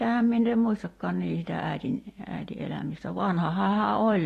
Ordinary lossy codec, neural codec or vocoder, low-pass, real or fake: AAC, 48 kbps; vocoder, 44.1 kHz, 128 mel bands every 256 samples, BigVGAN v2; 14.4 kHz; fake